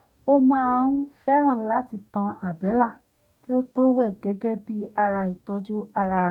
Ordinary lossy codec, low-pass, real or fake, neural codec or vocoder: none; 19.8 kHz; fake; codec, 44.1 kHz, 2.6 kbps, DAC